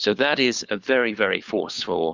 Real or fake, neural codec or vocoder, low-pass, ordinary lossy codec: fake; codec, 16 kHz, 4 kbps, FunCodec, trained on LibriTTS, 50 frames a second; 7.2 kHz; Opus, 64 kbps